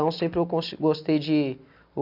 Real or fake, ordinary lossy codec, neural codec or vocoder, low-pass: real; none; none; 5.4 kHz